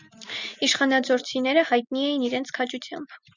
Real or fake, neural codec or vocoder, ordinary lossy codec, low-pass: real; none; Opus, 64 kbps; 7.2 kHz